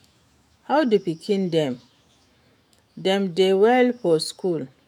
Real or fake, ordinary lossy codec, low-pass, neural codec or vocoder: fake; none; 19.8 kHz; autoencoder, 48 kHz, 128 numbers a frame, DAC-VAE, trained on Japanese speech